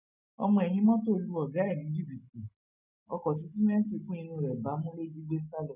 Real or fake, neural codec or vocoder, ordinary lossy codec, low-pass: real; none; none; 3.6 kHz